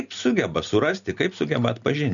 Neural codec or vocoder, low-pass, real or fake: none; 7.2 kHz; real